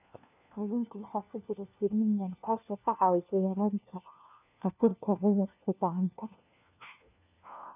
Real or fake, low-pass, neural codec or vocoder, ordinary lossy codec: fake; 3.6 kHz; codec, 16 kHz, 1 kbps, FunCodec, trained on LibriTTS, 50 frames a second; none